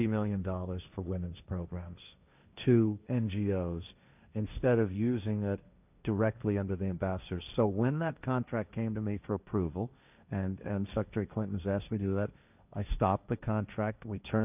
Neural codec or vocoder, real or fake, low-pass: codec, 16 kHz, 1.1 kbps, Voila-Tokenizer; fake; 3.6 kHz